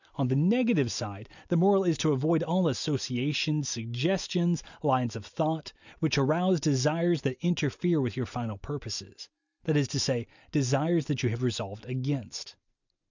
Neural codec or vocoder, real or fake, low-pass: none; real; 7.2 kHz